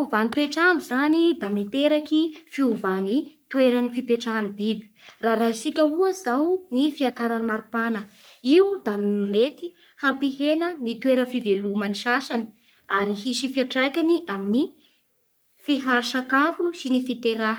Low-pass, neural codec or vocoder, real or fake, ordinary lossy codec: none; codec, 44.1 kHz, 3.4 kbps, Pupu-Codec; fake; none